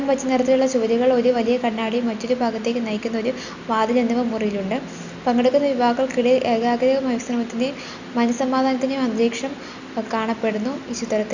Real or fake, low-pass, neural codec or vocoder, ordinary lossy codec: real; 7.2 kHz; none; Opus, 64 kbps